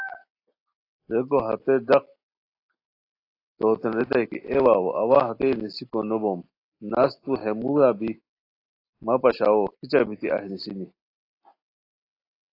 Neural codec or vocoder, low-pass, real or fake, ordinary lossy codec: none; 5.4 kHz; real; AAC, 32 kbps